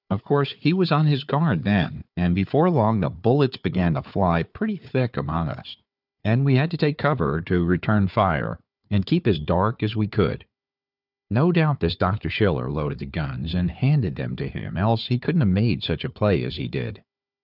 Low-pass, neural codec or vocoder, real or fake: 5.4 kHz; codec, 16 kHz, 4 kbps, FunCodec, trained on Chinese and English, 50 frames a second; fake